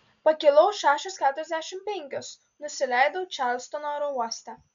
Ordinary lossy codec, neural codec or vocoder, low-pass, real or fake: MP3, 64 kbps; none; 7.2 kHz; real